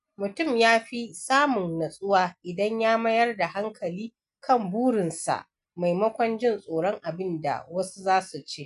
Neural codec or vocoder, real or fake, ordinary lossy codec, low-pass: none; real; none; 10.8 kHz